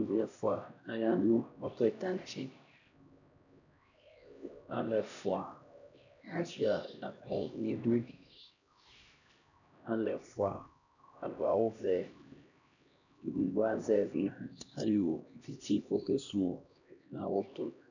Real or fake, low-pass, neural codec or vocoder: fake; 7.2 kHz; codec, 16 kHz, 1 kbps, X-Codec, HuBERT features, trained on LibriSpeech